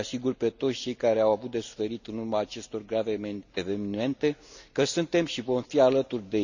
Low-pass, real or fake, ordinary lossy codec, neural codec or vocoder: 7.2 kHz; real; none; none